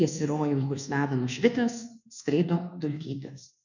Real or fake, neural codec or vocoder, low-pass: fake; codec, 24 kHz, 1.2 kbps, DualCodec; 7.2 kHz